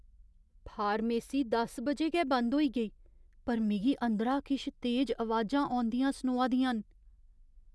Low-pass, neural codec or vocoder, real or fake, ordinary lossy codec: none; none; real; none